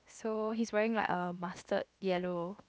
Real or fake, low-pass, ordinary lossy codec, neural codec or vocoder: fake; none; none; codec, 16 kHz, 0.7 kbps, FocalCodec